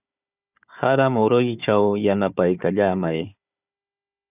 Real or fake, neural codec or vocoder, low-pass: fake; codec, 16 kHz, 4 kbps, FunCodec, trained on Chinese and English, 50 frames a second; 3.6 kHz